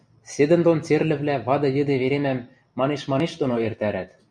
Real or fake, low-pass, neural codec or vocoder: real; 9.9 kHz; none